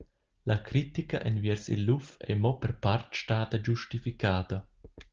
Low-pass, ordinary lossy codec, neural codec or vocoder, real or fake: 7.2 kHz; Opus, 16 kbps; none; real